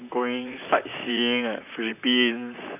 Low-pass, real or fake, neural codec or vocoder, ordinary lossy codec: 3.6 kHz; fake; vocoder, 44.1 kHz, 128 mel bands, Pupu-Vocoder; none